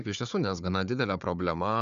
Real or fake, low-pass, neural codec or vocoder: fake; 7.2 kHz; codec, 16 kHz, 4 kbps, FunCodec, trained on Chinese and English, 50 frames a second